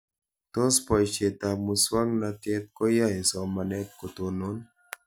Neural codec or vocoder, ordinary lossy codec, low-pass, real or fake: none; none; none; real